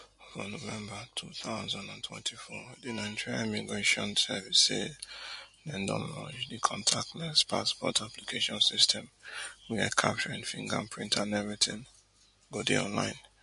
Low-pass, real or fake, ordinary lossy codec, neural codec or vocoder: 10.8 kHz; real; MP3, 48 kbps; none